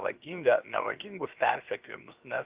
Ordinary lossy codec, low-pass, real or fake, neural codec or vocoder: Opus, 32 kbps; 3.6 kHz; fake; codec, 16 kHz, 0.7 kbps, FocalCodec